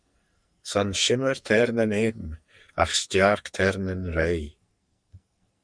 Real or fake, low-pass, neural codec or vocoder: fake; 9.9 kHz; codec, 44.1 kHz, 2.6 kbps, SNAC